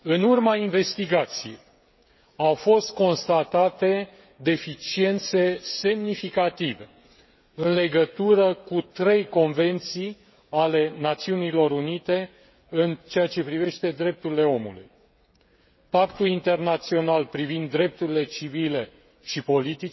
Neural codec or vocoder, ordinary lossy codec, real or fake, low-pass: codec, 16 kHz, 16 kbps, FreqCodec, smaller model; MP3, 24 kbps; fake; 7.2 kHz